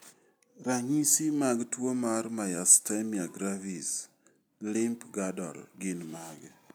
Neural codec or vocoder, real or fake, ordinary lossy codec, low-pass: none; real; none; none